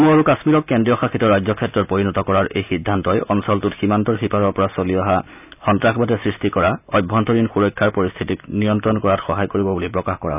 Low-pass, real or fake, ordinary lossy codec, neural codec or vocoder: 3.6 kHz; real; none; none